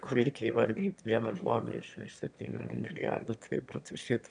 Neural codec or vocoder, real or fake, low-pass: autoencoder, 22.05 kHz, a latent of 192 numbers a frame, VITS, trained on one speaker; fake; 9.9 kHz